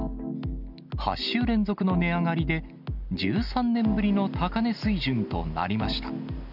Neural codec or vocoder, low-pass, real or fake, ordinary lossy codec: none; 5.4 kHz; real; none